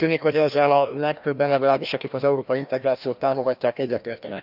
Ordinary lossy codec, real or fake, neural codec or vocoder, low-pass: none; fake; codec, 16 kHz, 1 kbps, FreqCodec, larger model; 5.4 kHz